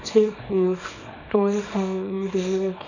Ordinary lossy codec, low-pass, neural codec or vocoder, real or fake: none; 7.2 kHz; codec, 24 kHz, 0.9 kbps, WavTokenizer, small release; fake